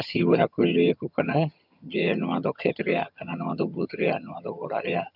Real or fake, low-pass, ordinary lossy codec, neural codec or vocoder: fake; 5.4 kHz; none; vocoder, 22.05 kHz, 80 mel bands, HiFi-GAN